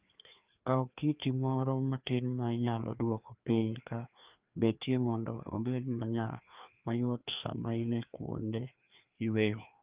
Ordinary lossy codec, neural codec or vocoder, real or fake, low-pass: Opus, 24 kbps; codec, 16 kHz, 2 kbps, FreqCodec, larger model; fake; 3.6 kHz